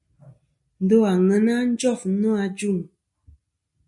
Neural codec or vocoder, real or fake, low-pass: none; real; 10.8 kHz